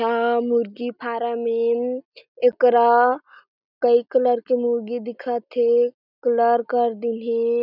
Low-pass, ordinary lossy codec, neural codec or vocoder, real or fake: 5.4 kHz; none; none; real